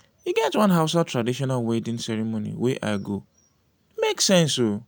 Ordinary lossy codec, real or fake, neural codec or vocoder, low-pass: none; real; none; none